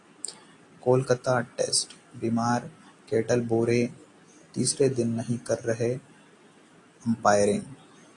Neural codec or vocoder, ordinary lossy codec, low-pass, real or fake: none; AAC, 48 kbps; 10.8 kHz; real